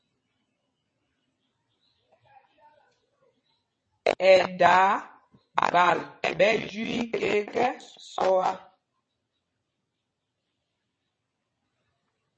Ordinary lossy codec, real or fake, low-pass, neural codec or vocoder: MP3, 32 kbps; fake; 9.9 kHz; vocoder, 22.05 kHz, 80 mel bands, WaveNeXt